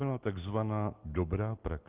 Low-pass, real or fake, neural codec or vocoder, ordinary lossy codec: 3.6 kHz; real; none; Opus, 16 kbps